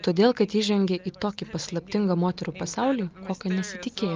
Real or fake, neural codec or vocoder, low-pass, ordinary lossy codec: real; none; 7.2 kHz; Opus, 32 kbps